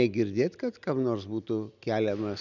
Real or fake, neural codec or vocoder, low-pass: real; none; 7.2 kHz